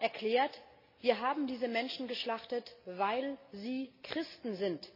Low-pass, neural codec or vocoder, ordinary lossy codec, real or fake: 5.4 kHz; none; MP3, 24 kbps; real